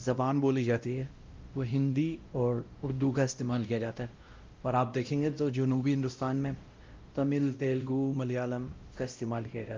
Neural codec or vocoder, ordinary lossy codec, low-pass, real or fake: codec, 16 kHz, 0.5 kbps, X-Codec, WavLM features, trained on Multilingual LibriSpeech; Opus, 24 kbps; 7.2 kHz; fake